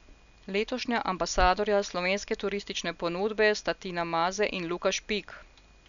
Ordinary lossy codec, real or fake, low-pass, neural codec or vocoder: none; real; 7.2 kHz; none